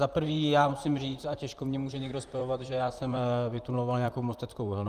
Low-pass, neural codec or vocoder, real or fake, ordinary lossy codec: 14.4 kHz; vocoder, 44.1 kHz, 128 mel bands, Pupu-Vocoder; fake; Opus, 32 kbps